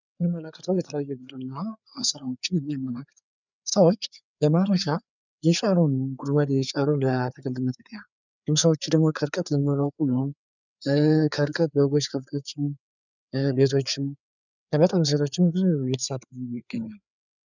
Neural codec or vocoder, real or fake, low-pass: codec, 16 kHz, 4 kbps, FreqCodec, larger model; fake; 7.2 kHz